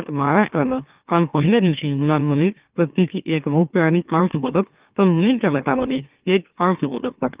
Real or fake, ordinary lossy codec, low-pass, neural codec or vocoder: fake; Opus, 32 kbps; 3.6 kHz; autoencoder, 44.1 kHz, a latent of 192 numbers a frame, MeloTTS